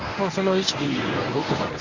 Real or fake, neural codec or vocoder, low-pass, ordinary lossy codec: fake; codec, 24 kHz, 0.9 kbps, WavTokenizer, medium speech release version 1; 7.2 kHz; none